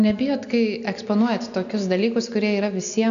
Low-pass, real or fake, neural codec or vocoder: 7.2 kHz; real; none